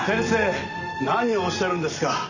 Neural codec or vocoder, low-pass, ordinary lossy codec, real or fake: none; 7.2 kHz; none; real